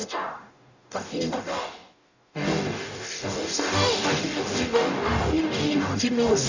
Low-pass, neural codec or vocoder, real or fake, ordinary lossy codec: 7.2 kHz; codec, 44.1 kHz, 0.9 kbps, DAC; fake; none